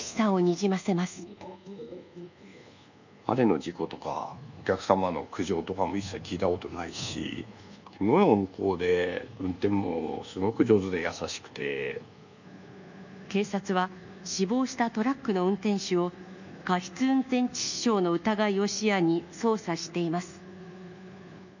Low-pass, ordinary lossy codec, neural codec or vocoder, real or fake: 7.2 kHz; none; codec, 24 kHz, 1.2 kbps, DualCodec; fake